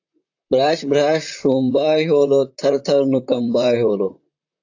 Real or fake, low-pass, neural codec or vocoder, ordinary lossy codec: fake; 7.2 kHz; vocoder, 44.1 kHz, 128 mel bands, Pupu-Vocoder; AAC, 48 kbps